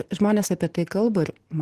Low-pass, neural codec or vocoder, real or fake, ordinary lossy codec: 14.4 kHz; none; real; Opus, 16 kbps